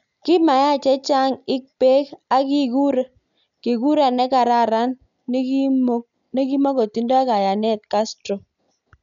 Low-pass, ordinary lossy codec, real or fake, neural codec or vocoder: 7.2 kHz; none; real; none